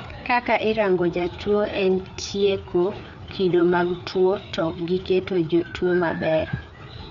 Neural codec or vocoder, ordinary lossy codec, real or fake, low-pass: codec, 16 kHz, 4 kbps, FreqCodec, larger model; none; fake; 7.2 kHz